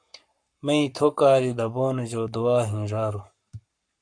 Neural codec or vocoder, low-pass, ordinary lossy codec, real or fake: codec, 44.1 kHz, 7.8 kbps, Pupu-Codec; 9.9 kHz; MP3, 64 kbps; fake